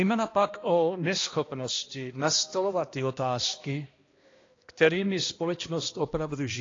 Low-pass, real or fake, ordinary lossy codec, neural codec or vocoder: 7.2 kHz; fake; AAC, 32 kbps; codec, 16 kHz, 1 kbps, X-Codec, HuBERT features, trained on balanced general audio